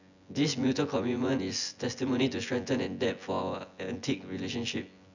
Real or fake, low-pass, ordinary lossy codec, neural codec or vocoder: fake; 7.2 kHz; none; vocoder, 24 kHz, 100 mel bands, Vocos